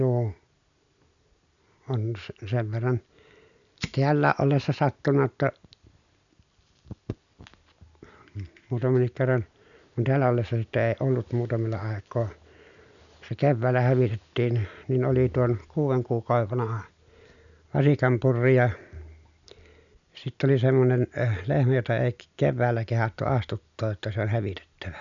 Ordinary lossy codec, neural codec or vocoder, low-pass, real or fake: none; none; 7.2 kHz; real